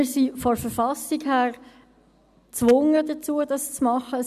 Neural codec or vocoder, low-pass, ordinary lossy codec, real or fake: none; 14.4 kHz; none; real